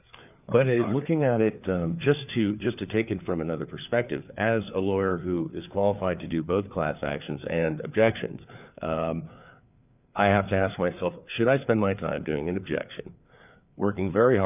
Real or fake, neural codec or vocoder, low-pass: fake; codec, 16 kHz, 4 kbps, FreqCodec, larger model; 3.6 kHz